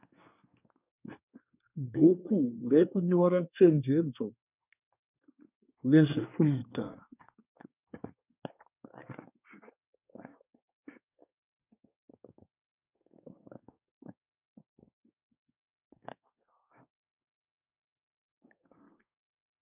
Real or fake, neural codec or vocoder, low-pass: fake; codec, 24 kHz, 1 kbps, SNAC; 3.6 kHz